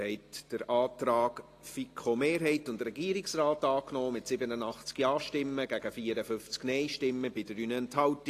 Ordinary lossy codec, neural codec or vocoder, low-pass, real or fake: AAC, 48 kbps; none; 14.4 kHz; real